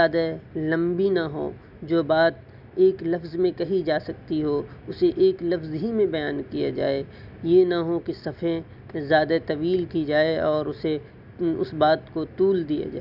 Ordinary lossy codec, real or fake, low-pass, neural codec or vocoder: none; real; 5.4 kHz; none